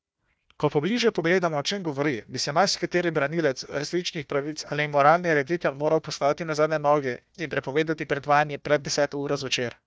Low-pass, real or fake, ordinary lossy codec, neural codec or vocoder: none; fake; none; codec, 16 kHz, 1 kbps, FunCodec, trained on Chinese and English, 50 frames a second